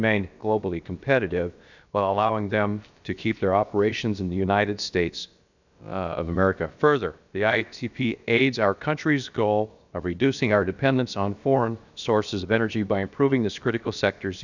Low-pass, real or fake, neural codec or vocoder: 7.2 kHz; fake; codec, 16 kHz, about 1 kbps, DyCAST, with the encoder's durations